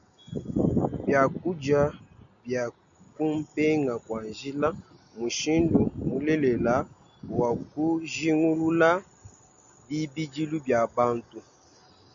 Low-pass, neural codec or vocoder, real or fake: 7.2 kHz; none; real